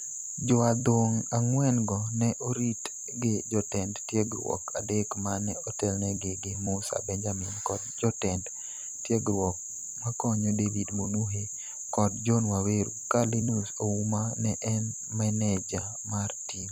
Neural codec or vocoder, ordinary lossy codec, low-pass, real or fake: vocoder, 44.1 kHz, 128 mel bands every 256 samples, BigVGAN v2; none; 19.8 kHz; fake